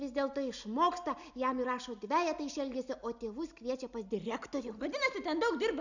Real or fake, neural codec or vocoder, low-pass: real; none; 7.2 kHz